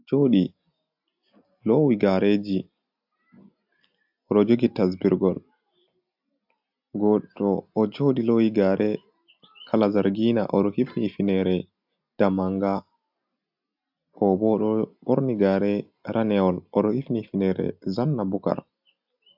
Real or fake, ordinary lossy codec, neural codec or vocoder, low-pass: real; AAC, 48 kbps; none; 5.4 kHz